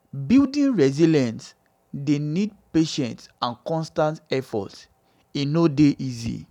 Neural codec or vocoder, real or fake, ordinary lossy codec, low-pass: none; real; none; 19.8 kHz